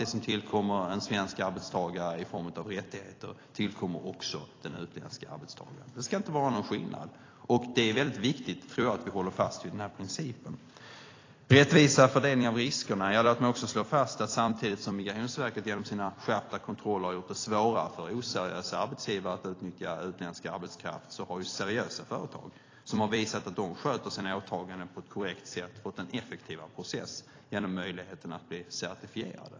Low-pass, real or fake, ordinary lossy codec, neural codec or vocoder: 7.2 kHz; fake; AAC, 32 kbps; vocoder, 44.1 kHz, 128 mel bands every 256 samples, BigVGAN v2